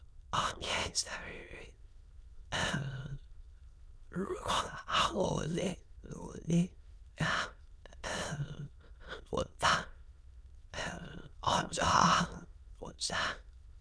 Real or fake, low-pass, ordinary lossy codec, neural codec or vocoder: fake; none; none; autoencoder, 22.05 kHz, a latent of 192 numbers a frame, VITS, trained on many speakers